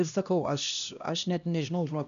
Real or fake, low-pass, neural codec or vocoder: fake; 7.2 kHz; codec, 16 kHz, 1 kbps, X-Codec, HuBERT features, trained on LibriSpeech